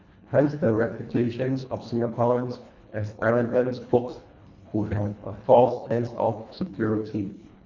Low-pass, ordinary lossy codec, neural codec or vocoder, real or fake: 7.2 kHz; Opus, 64 kbps; codec, 24 kHz, 1.5 kbps, HILCodec; fake